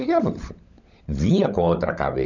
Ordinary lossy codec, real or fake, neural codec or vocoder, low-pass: none; fake; codec, 16 kHz, 16 kbps, FunCodec, trained on LibriTTS, 50 frames a second; 7.2 kHz